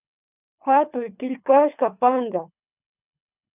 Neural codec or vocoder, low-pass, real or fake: codec, 24 kHz, 3 kbps, HILCodec; 3.6 kHz; fake